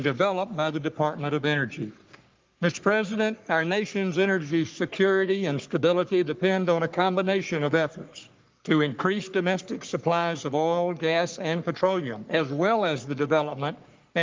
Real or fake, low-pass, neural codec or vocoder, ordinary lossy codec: fake; 7.2 kHz; codec, 44.1 kHz, 3.4 kbps, Pupu-Codec; Opus, 32 kbps